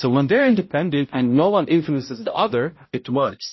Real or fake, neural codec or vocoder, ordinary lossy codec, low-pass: fake; codec, 16 kHz, 0.5 kbps, X-Codec, HuBERT features, trained on balanced general audio; MP3, 24 kbps; 7.2 kHz